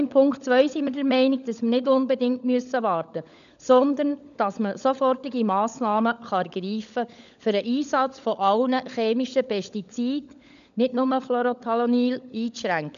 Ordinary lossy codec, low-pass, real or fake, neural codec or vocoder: none; 7.2 kHz; fake; codec, 16 kHz, 16 kbps, FunCodec, trained on LibriTTS, 50 frames a second